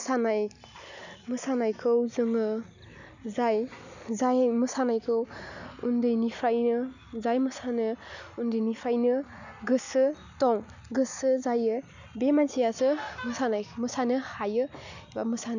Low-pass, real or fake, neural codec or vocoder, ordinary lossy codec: 7.2 kHz; real; none; none